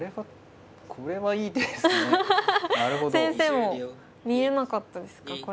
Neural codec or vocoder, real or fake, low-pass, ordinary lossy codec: none; real; none; none